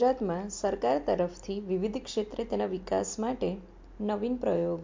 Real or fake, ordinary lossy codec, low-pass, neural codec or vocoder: real; MP3, 48 kbps; 7.2 kHz; none